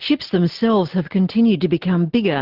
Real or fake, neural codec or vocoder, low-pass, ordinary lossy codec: real; none; 5.4 kHz; Opus, 16 kbps